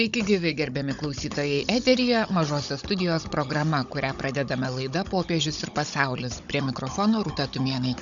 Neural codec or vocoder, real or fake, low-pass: codec, 16 kHz, 16 kbps, FunCodec, trained on Chinese and English, 50 frames a second; fake; 7.2 kHz